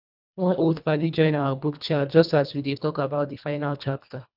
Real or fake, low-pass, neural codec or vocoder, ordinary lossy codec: fake; 5.4 kHz; codec, 24 kHz, 1.5 kbps, HILCodec; none